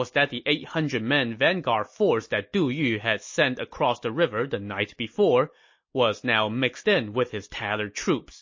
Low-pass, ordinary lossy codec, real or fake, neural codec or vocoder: 7.2 kHz; MP3, 32 kbps; real; none